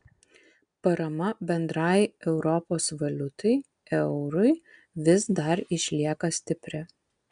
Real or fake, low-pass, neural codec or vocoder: real; 9.9 kHz; none